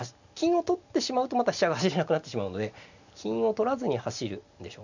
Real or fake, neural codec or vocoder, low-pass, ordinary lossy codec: real; none; 7.2 kHz; none